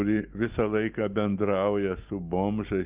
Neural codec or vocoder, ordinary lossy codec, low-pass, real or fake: none; Opus, 32 kbps; 3.6 kHz; real